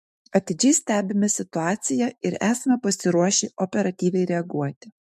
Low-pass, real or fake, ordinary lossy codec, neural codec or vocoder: 14.4 kHz; fake; MP3, 64 kbps; autoencoder, 48 kHz, 128 numbers a frame, DAC-VAE, trained on Japanese speech